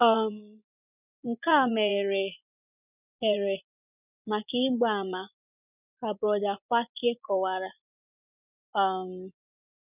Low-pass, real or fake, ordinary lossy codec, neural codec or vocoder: 3.6 kHz; fake; none; vocoder, 24 kHz, 100 mel bands, Vocos